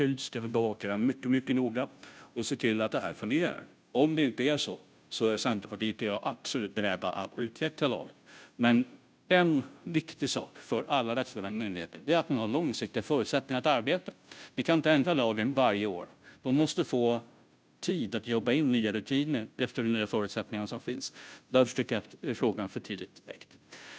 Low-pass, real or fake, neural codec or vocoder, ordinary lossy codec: none; fake; codec, 16 kHz, 0.5 kbps, FunCodec, trained on Chinese and English, 25 frames a second; none